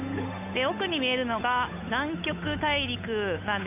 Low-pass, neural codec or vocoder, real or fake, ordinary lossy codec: 3.6 kHz; codec, 16 kHz, 8 kbps, FunCodec, trained on Chinese and English, 25 frames a second; fake; none